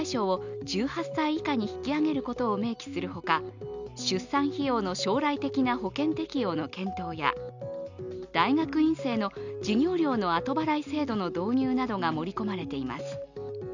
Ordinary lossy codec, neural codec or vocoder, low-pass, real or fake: none; none; 7.2 kHz; real